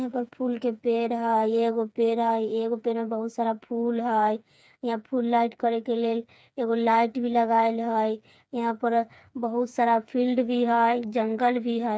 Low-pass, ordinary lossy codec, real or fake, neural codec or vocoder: none; none; fake; codec, 16 kHz, 4 kbps, FreqCodec, smaller model